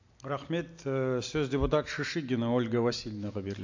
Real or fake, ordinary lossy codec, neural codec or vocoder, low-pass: real; AAC, 48 kbps; none; 7.2 kHz